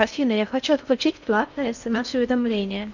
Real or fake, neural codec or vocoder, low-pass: fake; codec, 16 kHz in and 24 kHz out, 0.6 kbps, FocalCodec, streaming, 4096 codes; 7.2 kHz